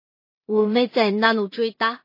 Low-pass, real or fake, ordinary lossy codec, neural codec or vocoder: 5.4 kHz; fake; MP3, 24 kbps; codec, 16 kHz in and 24 kHz out, 0.4 kbps, LongCat-Audio-Codec, two codebook decoder